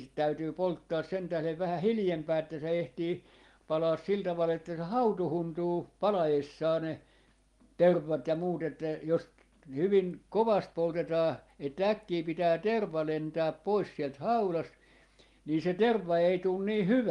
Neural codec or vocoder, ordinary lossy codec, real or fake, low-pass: none; Opus, 24 kbps; real; 10.8 kHz